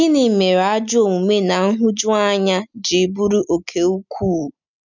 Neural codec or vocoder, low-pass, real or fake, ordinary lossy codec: none; 7.2 kHz; real; none